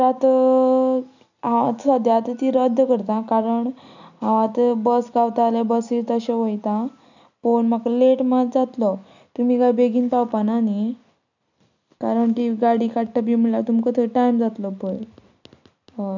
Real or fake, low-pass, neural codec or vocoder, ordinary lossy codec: real; 7.2 kHz; none; none